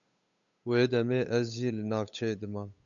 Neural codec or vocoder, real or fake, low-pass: codec, 16 kHz, 8 kbps, FunCodec, trained on Chinese and English, 25 frames a second; fake; 7.2 kHz